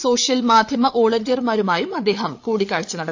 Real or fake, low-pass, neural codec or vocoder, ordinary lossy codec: fake; 7.2 kHz; codec, 16 kHz, 8 kbps, FreqCodec, larger model; AAC, 48 kbps